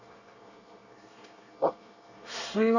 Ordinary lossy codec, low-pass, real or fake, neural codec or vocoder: none; 7.2 kHz; fake; codec, 24 kHz, 1 kbps, SNAC